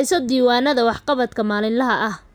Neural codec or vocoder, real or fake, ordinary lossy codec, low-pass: none; real; none; none